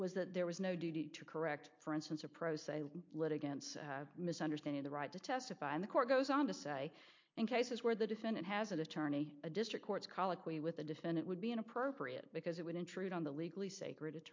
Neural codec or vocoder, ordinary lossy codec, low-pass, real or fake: none; MP3, 48 kbps; 7.2 kHz; real